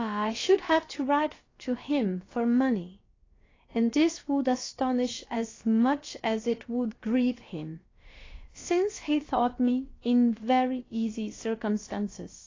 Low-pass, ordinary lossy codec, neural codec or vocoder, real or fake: 7.2 kHz; AAC, 32 kbps; codec, 16 kHz, about 1 kbps, DyCAST, with the encoder's durations; fake